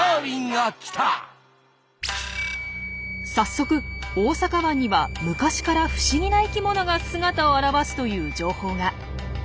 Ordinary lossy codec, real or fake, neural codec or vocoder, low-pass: none; real; none; none